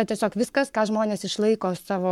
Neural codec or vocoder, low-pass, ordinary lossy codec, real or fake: codec, 44.1 kHz, 7.8 kbps, Pupu-Codec; 19.8 kHz; MP3, 96 kbps; fake